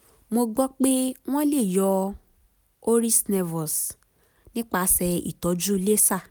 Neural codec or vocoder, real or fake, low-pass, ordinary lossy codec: none; real; none; none